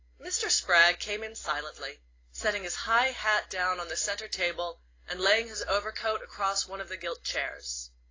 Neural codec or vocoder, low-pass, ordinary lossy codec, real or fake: none; 7.2 kHz; AAC, 32 kbps; real